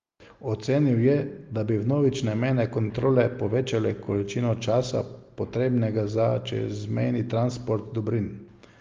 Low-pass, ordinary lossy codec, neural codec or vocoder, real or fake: 7.2 kHz; Opus, 32 kbps; none; real